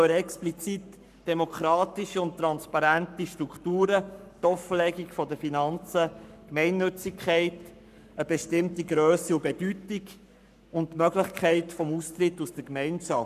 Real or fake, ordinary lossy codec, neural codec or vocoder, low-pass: fake; none; codec, 44.1 kHz, 7.8 kbps, Pupu-Codec; 14.4 kHz